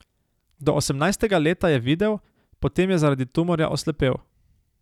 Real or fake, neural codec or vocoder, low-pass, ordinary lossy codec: real; none; 19.8 kHz; none